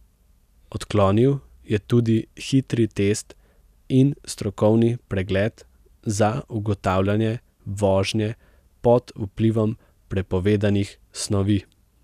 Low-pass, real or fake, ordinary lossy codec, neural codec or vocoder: 14.4 kHz; real; none; none